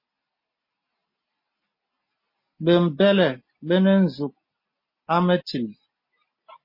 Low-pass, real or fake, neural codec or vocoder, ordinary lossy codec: 5.4 kHz; real; none; MP3, 24 kbps